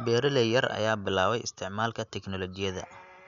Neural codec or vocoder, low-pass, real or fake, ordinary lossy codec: none; 7.2 kHz; real; none